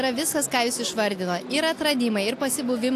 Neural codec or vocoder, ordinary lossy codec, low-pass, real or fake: none; AAC, 64 kbps; 14.4 kHz; real